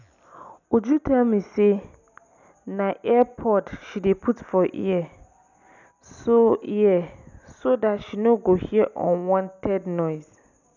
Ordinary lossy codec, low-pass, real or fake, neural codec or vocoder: none; 7.2 kHz; real; none